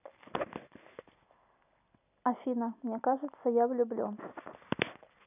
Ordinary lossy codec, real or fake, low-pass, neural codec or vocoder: none; real; 3.6 kHz; none